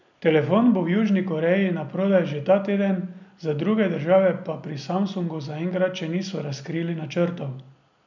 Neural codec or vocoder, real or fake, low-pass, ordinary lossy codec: none; real; 7.2 kHz; none